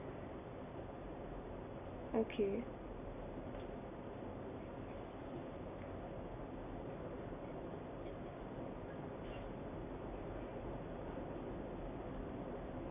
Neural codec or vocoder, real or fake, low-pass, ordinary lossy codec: none; real; 3.6 kHz; none